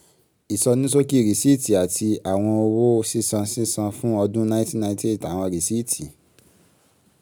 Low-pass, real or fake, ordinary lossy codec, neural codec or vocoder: none; real; none; none